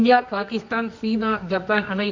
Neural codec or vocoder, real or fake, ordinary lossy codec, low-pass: codec, 24 kHz, 0.9 kbps, WavTokenizer, medium music audio release; fake; MP3, 48 kbps; 7.2 kHz